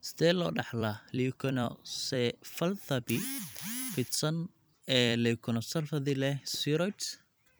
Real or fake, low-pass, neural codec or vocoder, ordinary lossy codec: fake; none; vocoder, 44.1 kHz, 128 mel bands every 256 samples, BigVGAN v2; none